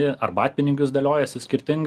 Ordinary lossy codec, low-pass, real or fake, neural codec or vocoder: Opus, 24 kbps; 14.4 kHz; real; none